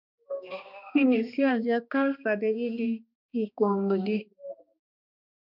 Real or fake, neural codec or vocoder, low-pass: fake; codec, 16 kHz, 2 kbps, X-Codec, HuBERT features, trained on balanced general audio; 5.4 kHz